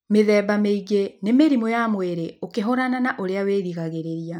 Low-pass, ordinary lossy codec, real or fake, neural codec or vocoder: 19.8 kHz; none; real; none